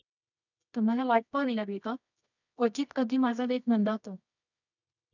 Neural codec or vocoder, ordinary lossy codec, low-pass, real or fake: codec, 24 kHz, 0.9 kbps, WavTokenizer, medium music audio release; none; 7.2 kHz; fake